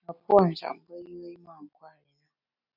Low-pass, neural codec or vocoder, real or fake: 5.4 kHz; none; real